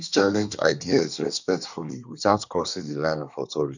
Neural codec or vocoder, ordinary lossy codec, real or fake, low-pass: codec, 24 kHz, 1 kbps, SNAC; none; fake; 7.2 kHz